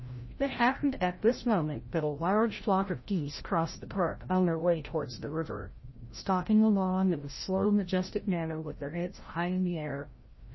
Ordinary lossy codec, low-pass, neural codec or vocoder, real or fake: MP3, 24 kbps; 7.2 kHz; codec, 16 kHz, 0.5 kbps, FreqCodec, larger model; fake